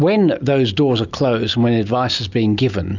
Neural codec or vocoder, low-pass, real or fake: none; 7.2 kHz; real